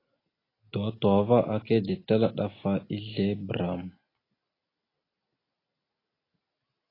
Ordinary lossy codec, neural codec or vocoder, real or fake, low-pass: AAC, 24 kbps; none; real; 5.4 kHz